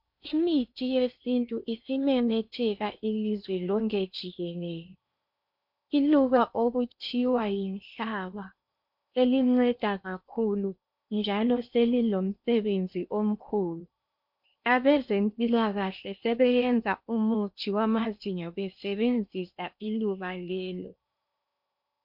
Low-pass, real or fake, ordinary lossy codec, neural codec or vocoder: 5.4 kHz; fake; MP3, 48 kbps; codec, 16 kHz in and 24 kHz out, 0.8 kbps, FocalCodec, streaming, 65536 codes